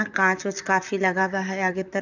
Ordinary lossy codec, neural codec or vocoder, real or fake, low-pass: none; vocoder, 44.1 kHz, 128 mel bands, Pupu-Vocoder; fake; 7.2 kHz